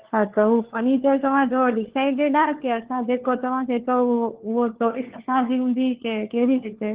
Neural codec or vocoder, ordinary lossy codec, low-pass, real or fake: codec, 16 kHz, 4 kbps, FunCodec, trained on LibriTTS, 50 frames a second; Opus, 16 kbps; 3.6 kHz; fake